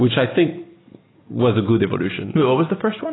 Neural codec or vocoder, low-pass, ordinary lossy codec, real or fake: none; 7.2 kHz; AAC, 16 kbps; real